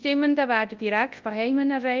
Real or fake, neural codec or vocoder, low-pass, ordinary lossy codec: fake; codec, 24 kHz, 0.9 kbps, WavTokenizer, large speech release; 7.2 kHz; Opus, 32 kbps